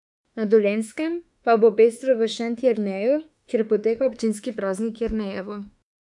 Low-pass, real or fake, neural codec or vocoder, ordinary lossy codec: 10.8 kHz; fake; autoencoder, 48 kHz, 32 numbers a frame, DAC-VAE, trained on Japanese speech; MP3, 96 kbps